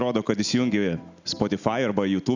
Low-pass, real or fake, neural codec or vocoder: 7.2 kHz; real; none